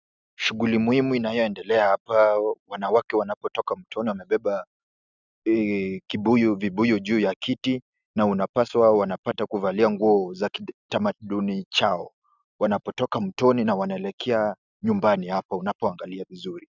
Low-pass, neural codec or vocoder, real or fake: 7.2 kHz; none; real